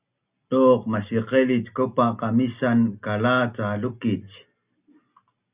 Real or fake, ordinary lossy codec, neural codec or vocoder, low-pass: real; Opus, 64 kbps; none; 3.6 kHz